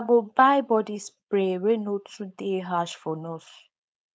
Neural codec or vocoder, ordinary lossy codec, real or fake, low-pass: codec, 16 kHz, 4.8 kbps, FACodec; none; fake; none